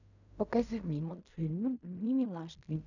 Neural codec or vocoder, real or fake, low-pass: codec, 16 kHz in and 24 kHz out, 0.4 kbps, LongCat-Audio-Codec, fine tuned four codebook decoder; fake; 7.2 kHz